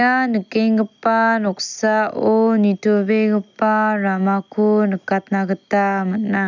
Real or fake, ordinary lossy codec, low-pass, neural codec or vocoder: real; none; 7.2 kHz; none